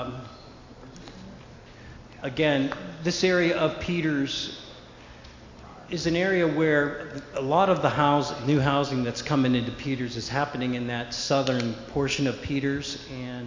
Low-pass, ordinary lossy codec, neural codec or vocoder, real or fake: 7.2 kHz; MP3, 48 kbps; none; real